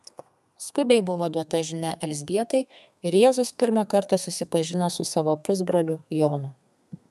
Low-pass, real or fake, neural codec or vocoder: 14.4 kHz; fake; codec, 32 kHz, 1.9 kbps, SNAC